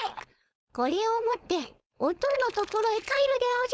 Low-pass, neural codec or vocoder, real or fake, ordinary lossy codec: none; codec, 16 kHz, 4.8 kbps, FACodec; fake; none